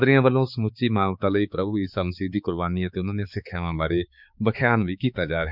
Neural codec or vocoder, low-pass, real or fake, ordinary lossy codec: codec, 16 kHz, 4 kbps, X-Codec, HuBERT features, trained on balanced general audio; 5.4 kHz; fake; none